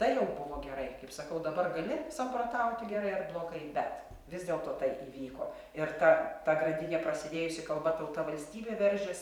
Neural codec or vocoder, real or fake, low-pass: vocoder, 44.1 kHz, 128 mel bands every 512 samples, BigVGAN v2; fake; 19.8 kHz